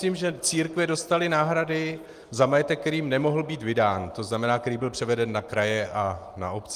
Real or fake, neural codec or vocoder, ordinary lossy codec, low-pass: real; none; Opus, 32 kbps; 14.4 kHz